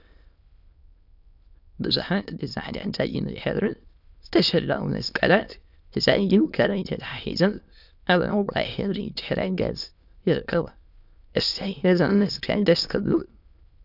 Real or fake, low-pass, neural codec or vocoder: fake; 5.4 kHz; autoencoder, 22.05 kHz, a latent of 192 numbers a frame, VITS, trained on many speakers